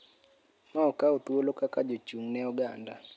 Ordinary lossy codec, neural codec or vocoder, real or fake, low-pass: none; none; real; none